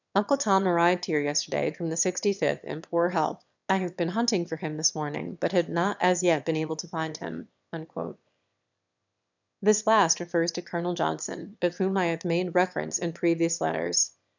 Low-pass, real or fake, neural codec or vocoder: 7.2 kHz; fake; autoencoder, 22.05 kHz, a latent of 192 numbers a frame, VITS, trained on one speaker